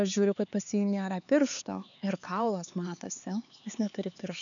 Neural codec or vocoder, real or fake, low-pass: codec, 16 kHz, 4 kbps, X-Codec, HuBERT features, trained on LibriSpeech; fake; 7.2 kHz